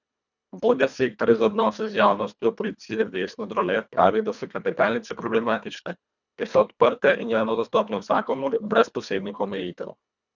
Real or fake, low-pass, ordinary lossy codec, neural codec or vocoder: fake; 7.2 kHz; none; codec, 24 kHz, 1.5 kbps, HILCodec